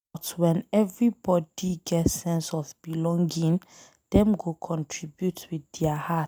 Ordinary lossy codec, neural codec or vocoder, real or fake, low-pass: none; none; real; none